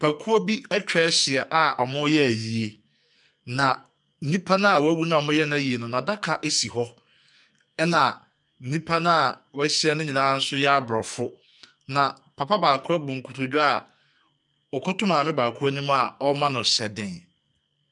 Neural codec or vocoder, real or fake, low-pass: codec, 44.1 kHz, 2.6 kbps, SNAC; fake; 10.8 kHz